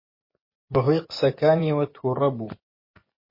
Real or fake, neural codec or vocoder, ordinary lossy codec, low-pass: fake; vocoder, 24 kHz, 100 mel bands, Vocos; MP3, 24 kbps; 5.4 kHz